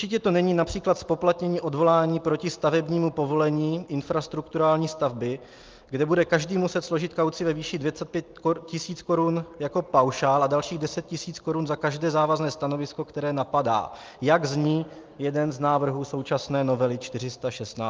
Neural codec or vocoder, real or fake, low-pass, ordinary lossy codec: none; real; 7.2 kHz; Opus, 24 kbps